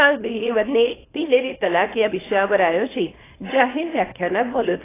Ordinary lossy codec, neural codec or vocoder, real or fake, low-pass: AAC, 16 kbps; codec, 24 kHz, 0.9 kbps, WavTokenizer, small release; fake; 3.6 kHz